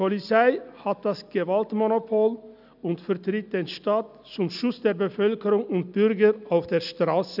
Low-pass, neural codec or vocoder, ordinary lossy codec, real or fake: 5.4 kHz; none; none; real